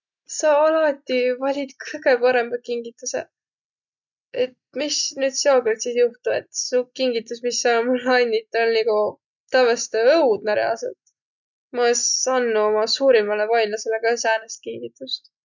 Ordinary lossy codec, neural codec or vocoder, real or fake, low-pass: none; none; real; 7.2 kHz